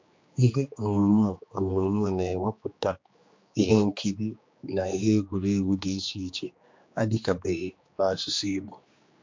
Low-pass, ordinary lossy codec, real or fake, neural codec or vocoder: 7.2 kHz; MP3, 48 kbps; fake; codec, 16 kHz, 2 kbps, X-Codec, HuBERT features, trained on general audio